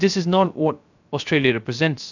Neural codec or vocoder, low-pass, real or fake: codec, 16 kHz, 0.3 kbps, FocalCodec; 7.2 kHz; fake